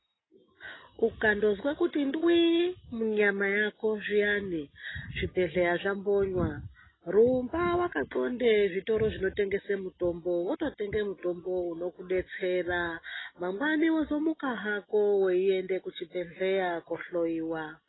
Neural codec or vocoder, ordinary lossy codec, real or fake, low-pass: none; AAC, 16 kbps; real; 7.2 kHz